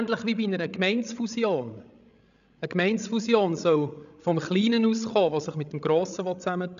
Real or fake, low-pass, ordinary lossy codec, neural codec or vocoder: fake; 7.2 kHz; none; codec, 16 kHz, 16 kbps, FreqCodec, larger model